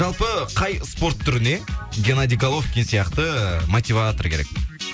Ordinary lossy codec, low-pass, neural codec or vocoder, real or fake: none; none; none; real